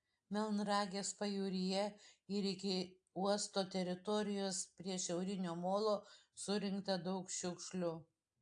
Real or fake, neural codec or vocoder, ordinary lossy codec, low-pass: real; none; AAC, 64 kbps; 9.9 kHz